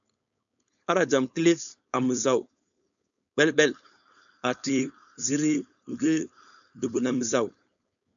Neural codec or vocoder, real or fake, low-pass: codec, 16 kHz, 4.8 kbps, FACodec; fake; 7.2 kHz